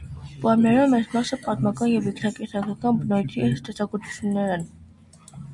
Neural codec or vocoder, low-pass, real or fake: none; 10.8 kHz; real